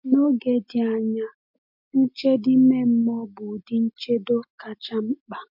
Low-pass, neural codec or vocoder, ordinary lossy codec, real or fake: 5.4 kHz; none; MP3, 48 kbps; real